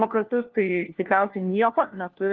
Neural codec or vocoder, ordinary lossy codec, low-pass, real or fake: codec, 16 kHz, 1 kbps, FunCodec, trained on LibriTTS, 50 frames a second; Opus, 16 kbps; 7.2 kHz; fake